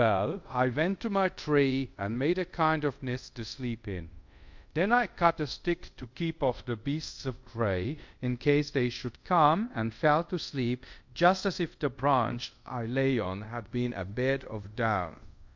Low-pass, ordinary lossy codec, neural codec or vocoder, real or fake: 7.2 kHz; MP3, 48 kbps; codec, 24 kHz, 0.5 kbps, DualCodec; fake